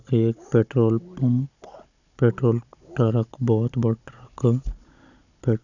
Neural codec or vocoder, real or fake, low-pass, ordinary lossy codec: codec, 16 kHz, 16 kbps, FunCodec, trained on Chinese and English, 50 frames a second; fake; 7.2 kHz; none